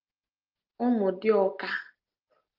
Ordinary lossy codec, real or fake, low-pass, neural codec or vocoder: Opus, 32 kbps; real; 5.4 kHz; none